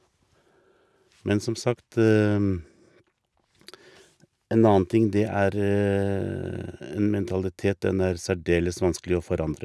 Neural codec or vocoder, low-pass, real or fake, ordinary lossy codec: none; none; real; none